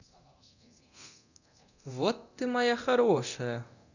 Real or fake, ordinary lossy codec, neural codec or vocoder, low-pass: fake; none; codec, 24 kHz, 0.9 kbps, DualCodec; 7.2 kHz